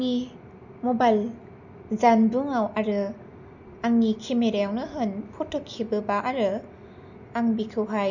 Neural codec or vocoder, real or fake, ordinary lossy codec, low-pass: none; real; none; 7.2 kHz